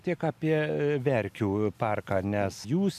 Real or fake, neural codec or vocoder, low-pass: real; none; 14.4 kHz